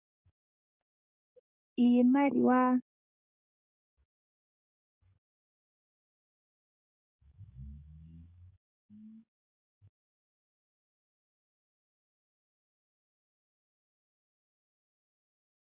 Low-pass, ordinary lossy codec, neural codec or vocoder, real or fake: 3.6 kHz; Opus, 64 kbps; codec, 44.1 kHz, 7.8 kbps, DAC; fake